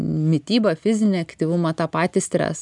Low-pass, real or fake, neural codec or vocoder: 10.8 kHz; real; none